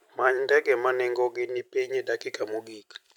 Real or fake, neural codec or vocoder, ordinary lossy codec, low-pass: fake; vocoder, 44.1 kHz, 128 mel bands every 256 samples, BigVGAN v2; none; 19.8 kHz